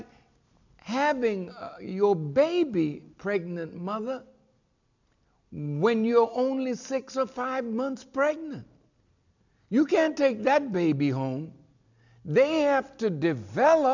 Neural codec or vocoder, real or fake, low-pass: none; real; 7.2 kHz